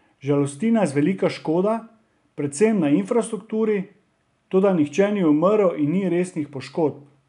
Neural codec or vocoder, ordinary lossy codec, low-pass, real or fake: none; none; 10.8 kHz; real